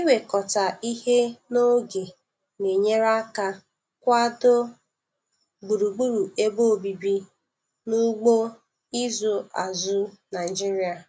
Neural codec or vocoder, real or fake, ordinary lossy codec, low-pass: none; real; none; none